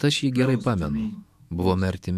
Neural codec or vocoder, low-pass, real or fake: autoencoder, 48 kHz, 128 numbers a frame, DAC-VAE, trained on Japanese speech; 14.4 kHz; fake